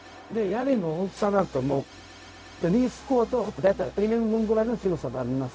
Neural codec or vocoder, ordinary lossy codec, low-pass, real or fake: codec, 16 kHz, 0.4 kbps, LongCat-Audio-Codec; none; none; fake